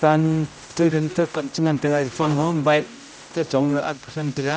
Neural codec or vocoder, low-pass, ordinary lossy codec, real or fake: codec, 16 kHz, 0.5 kbps, X-Codec, HuBERT features, trained on general audio; none; none; fake